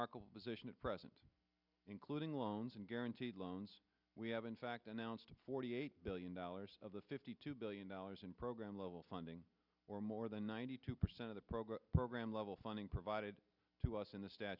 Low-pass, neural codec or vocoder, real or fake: 5.4 kHz; none; real